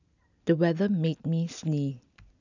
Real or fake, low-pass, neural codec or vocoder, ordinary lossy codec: real; 7.2 kHz; none; none